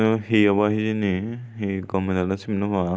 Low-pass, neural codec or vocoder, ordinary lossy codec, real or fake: none; none; none; real